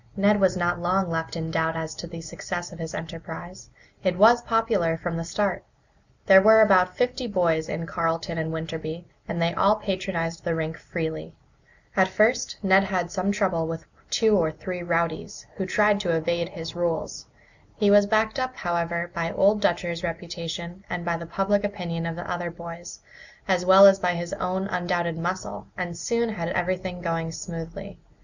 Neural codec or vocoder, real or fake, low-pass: none; real; 7.2 kHz